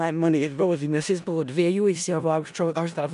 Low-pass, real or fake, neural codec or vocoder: 10.8 kHz; fake; codec, 16 kHz in and 24 kHz out, 0.4 kbps, LongCat-Audio-Codec, four codebook decoder